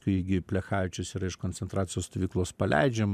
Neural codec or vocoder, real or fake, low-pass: none; real; 14.4 kHz